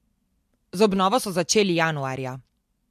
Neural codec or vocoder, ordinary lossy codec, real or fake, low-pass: none; MP3, 64 kbps; real; 14.4 kHz